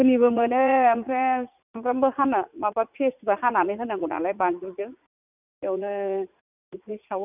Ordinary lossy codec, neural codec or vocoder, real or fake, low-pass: none; vocoder, 44.1 kHz, 80 mel bands, Vocos; fake; 3.6 kHz